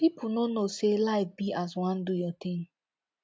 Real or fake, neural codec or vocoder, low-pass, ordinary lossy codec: real; none; none; none